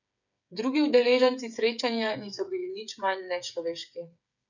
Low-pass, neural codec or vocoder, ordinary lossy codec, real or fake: 7.2 kHz; codec, 16 kHz, 8 kbps, FreqCodec, smaller model; none; fake